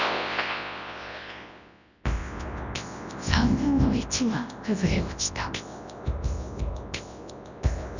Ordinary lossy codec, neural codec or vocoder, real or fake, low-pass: none; codec, 24 kHz, 0.9 kbps, WavTokenizer, large speech release; fake; 7.2 kHz